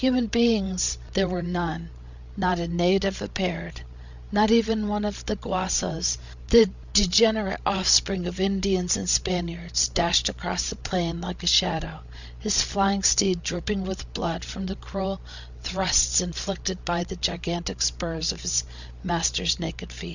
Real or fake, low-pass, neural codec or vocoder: fake; 7.2 kHz; codec, 16 kHz, 16 kbps, FreqCodec, larger model